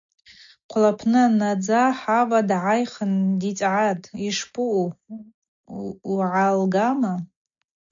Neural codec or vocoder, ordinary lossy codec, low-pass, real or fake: none; MP3, 48 kbps; 7.2 kHz; real